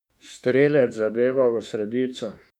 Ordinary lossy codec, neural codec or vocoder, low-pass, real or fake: MP3, 96 kbps; autoencoder, 48 kHz, 32 numbers a frame, DAC-VAE, trained on Japanese speech; 19.8 kHz; fake